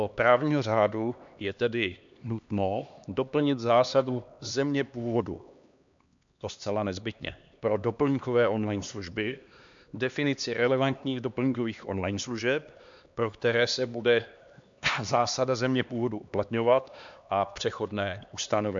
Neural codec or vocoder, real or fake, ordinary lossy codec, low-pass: codec, 16 kHz, 2 kbps, X-Codec, HuBERT features, trained on LibriSpeech; fake; MP3, 64 kbps; 7.2 kHz